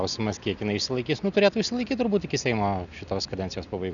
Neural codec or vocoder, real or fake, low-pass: none; real; 7.2 kHz